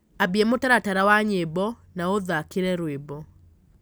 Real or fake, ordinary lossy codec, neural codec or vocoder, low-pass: real; none; none; none